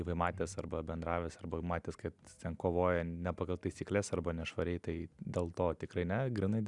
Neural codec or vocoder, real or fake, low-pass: none; real; 10.8 kHz